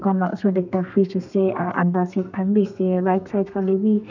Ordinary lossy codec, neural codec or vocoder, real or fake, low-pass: none; codec, 32 kHz, 1.9 kbps, SNAC; fake; 7.2 kHz